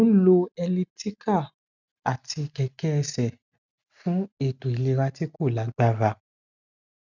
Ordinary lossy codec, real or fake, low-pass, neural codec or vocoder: none; real; 7.2 kHz; none